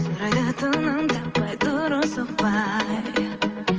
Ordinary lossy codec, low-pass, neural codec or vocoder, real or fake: Opus, 24 kbps; 7.2 kHz; none; real